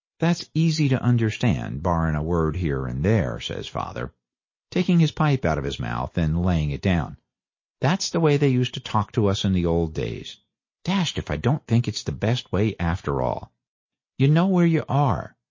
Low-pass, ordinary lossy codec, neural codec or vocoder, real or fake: 7.2 kHz; MP3, 32 kbps; none; real